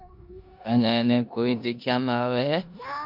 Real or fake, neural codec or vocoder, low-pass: fake; codec, 16 kHz in and 24 kHz out, 0.9 kbps, LongCat-Audio-Codec, four codebook decoder; 5.4 kHz